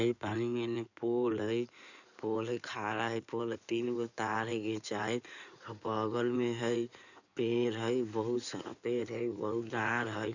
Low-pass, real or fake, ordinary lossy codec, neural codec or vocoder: 7.2 kHz; fake; none; codec, 16 kHz in and 24 kHz out, 2.2 kbps, FireRedTTS-2 codec